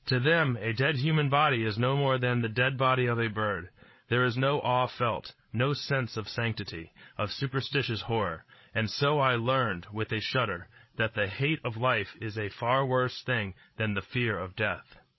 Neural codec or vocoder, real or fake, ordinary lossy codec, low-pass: codec, 16 kHz, 16 kbps, FreqCodec, larger model; fake; MP3, 24 kbps; 7.2 kHz